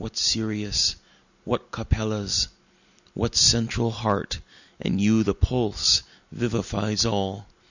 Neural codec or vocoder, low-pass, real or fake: none; 7.2 kHz; real